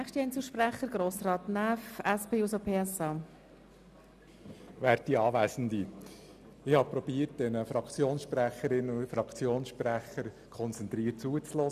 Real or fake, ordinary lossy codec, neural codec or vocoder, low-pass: real; none; none; 14.4 kHz